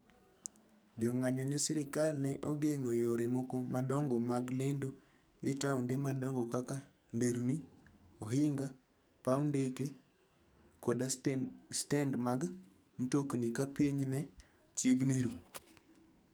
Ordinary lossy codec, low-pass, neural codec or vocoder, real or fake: none; none; codec, 44.1 kHz, 2.6 kbps, SNAC; fake